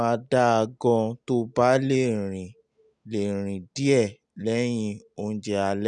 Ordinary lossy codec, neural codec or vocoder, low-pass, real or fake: none; none; 9.9 kHz; real